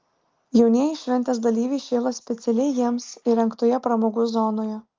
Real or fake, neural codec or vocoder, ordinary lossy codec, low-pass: real; none; Opus, 32 kbps; 7.2 kHz